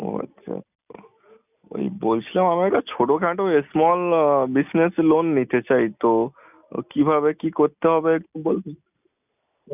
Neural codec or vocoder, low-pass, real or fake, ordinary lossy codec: none; 3.6 kHz; real; none